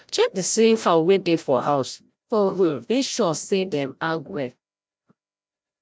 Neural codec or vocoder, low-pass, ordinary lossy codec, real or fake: codec, 16 kHz, 0.5 kbps, FreqCodec, larger model; none; none; fake